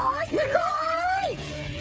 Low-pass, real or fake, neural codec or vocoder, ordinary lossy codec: none; fake; codec, 16 kHz, 8 kbps, FreqCodec, smaller model; none